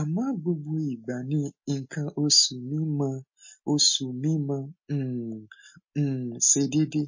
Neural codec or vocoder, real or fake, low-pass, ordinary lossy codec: none; real; 7.2 kHz; MP3, 32 kbps